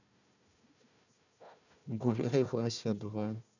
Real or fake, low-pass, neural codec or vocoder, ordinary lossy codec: fake; 7.2 kHz; codec, 16 kHz, 1 kbps, FunCodec, trained on Chinese and English, 50 frames a second; none